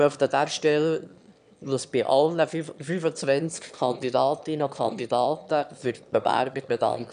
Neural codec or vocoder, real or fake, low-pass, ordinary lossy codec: autoencoder, 22.05 kHz, a latent of 192 numbers a frame, VITS, trained on one speaker; fake; 9.9 kHz; none